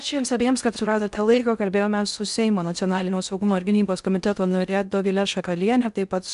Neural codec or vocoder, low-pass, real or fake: codec, 16 kHz in and 24 kHz out, 0.6 kbps, FocalCodec, streaming, 4096 codes; 10.8 kHz; fake